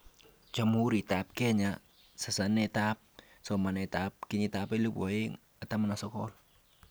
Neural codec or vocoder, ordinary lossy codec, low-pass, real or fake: none; none; none; real